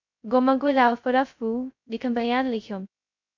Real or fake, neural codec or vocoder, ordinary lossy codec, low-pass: fake; codec, 16 kHz, 0.2 kbps, FocalCodec; MP3, 64 kbps; 7.2 kHz